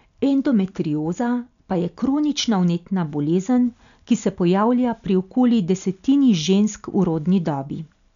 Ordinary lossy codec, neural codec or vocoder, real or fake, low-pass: none; none; real; 7.2 kHz